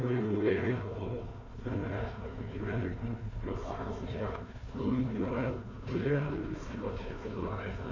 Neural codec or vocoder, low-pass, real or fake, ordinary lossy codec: codec, 16 kHz, 1 kbps, FunCodec, trained on Chinese and English, 50 frames a second; 7.2 kHz; fake; none